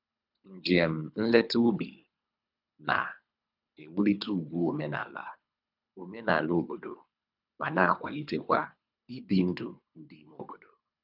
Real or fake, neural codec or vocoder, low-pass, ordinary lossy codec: fake; codec, 24 kHz, 3 kbps, HILCodec; 5.4 kHz; none